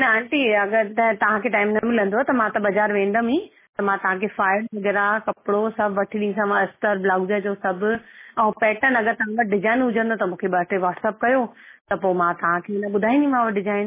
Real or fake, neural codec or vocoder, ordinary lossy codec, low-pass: real; none; MP3, 16 kbps; 3.6 kHz